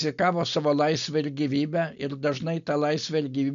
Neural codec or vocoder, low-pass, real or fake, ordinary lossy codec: none; 7.2 kHz; real; MP3, 96 kbps